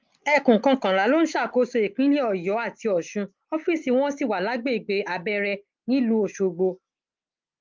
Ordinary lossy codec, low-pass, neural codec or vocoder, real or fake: Opus, 32 kbps; 7.2 kHz; none; real